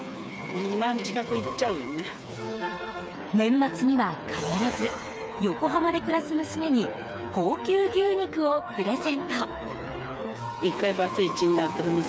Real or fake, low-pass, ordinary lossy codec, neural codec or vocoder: fake; none; none; codec, 16 kHz, 4 kbps, FreqCodec, smaller model